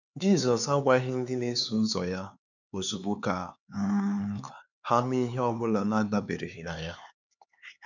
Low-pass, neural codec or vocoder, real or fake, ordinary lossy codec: 7.2 kHz; codec, 16 kHz, 4 kbps, X-Codec, HuBERT features, trained on LibriSpeech; fake; none